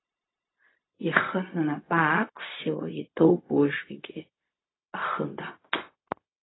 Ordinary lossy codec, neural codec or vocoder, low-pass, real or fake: AAC, 16 kbps; codec, 16 kHz, 0.4 kbps, LongCat-Audio-Codec; 7.2 kHz; fake